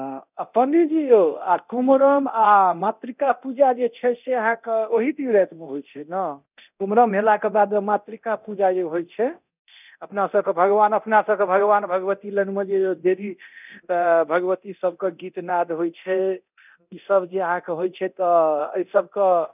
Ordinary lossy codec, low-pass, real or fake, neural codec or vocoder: none; 3.6 kHz; fake; codec, 24 kHz, 0.9 kbps, DualCodec